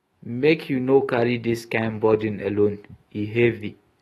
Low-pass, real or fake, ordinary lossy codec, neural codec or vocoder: 19.8 kHz; fake; AAC, 32 kbps; autoencoder, 48 kHz, 128 numbers a frame, DAC-VAE, trained on Japanese speech